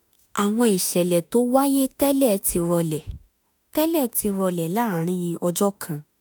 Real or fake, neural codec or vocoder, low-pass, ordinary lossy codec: fake; autoencoder, 48 kHz, 32 numbers a frame, DAC-VAE, trained on Japanese speech; none; none